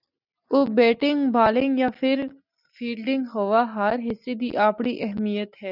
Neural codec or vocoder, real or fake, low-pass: none; real; 5.4 kHz